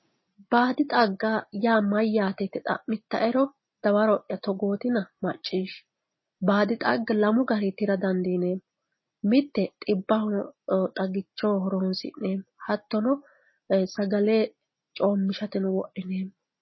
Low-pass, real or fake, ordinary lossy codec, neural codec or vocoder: 7.2 kHz; real; MP3, 24 kbps; none